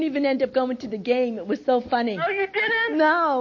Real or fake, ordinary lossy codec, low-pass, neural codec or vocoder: real; MP3, 32 kbps; 7.2 kHz; none